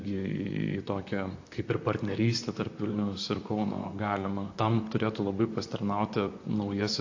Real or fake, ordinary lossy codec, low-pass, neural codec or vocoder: fake; AAC, 48 kbps; 7.2 kHz; vocoder, 44.1 kHz, 128 mel bands, Pupu-Vocoder